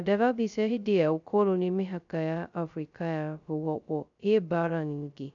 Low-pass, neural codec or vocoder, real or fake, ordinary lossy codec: 7.2 kHz; codec, 16 kHz, 0.2 kbps, FocalCodec; fake; none